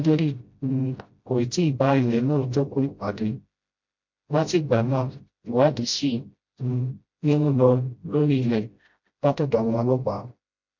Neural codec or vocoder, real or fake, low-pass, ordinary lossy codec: codec, 16 kHz, 0.5 kbps, FreqCodec, smaller model; fake; 7.2 kHz; MP3, 48 kbps